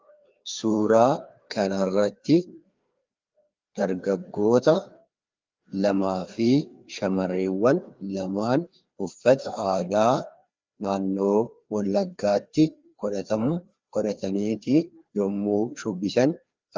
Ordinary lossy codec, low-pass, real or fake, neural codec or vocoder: Opus, 32 kbps; 7.2 kHz; fake; codec, 16 kHz, 2 kbps, FreqCodec, larger model